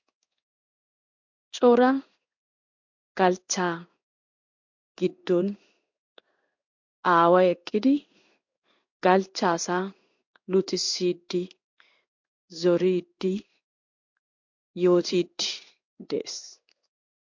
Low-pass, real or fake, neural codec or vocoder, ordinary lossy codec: 7.2 kHz; fake; codec, 16 kHz in and 24 kHz out, 1 kbps, XY-Tokenizer; MP3, 48 kbps